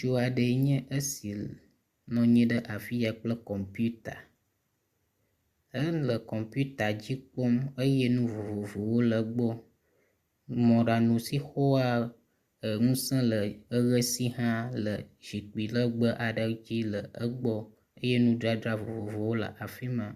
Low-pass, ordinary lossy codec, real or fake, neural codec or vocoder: 14.4 kHz; Opus, 64 kbps; real; none